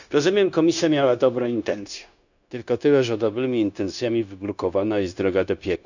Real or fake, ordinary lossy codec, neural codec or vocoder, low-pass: fake; none; codec, 16 kHz, 0.9 kbps, LongCat-Audio-Codec; 7.2 kHz